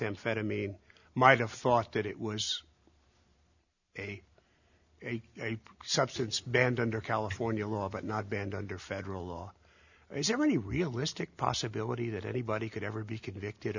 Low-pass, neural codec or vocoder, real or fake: 7.2 kHz; none; real